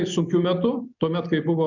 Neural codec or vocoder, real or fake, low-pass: none; real; 7.2 kHz